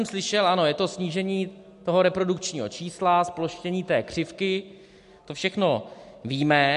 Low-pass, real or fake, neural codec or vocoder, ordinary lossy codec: 10.8 kHz; real; none; MP3, 64 kbps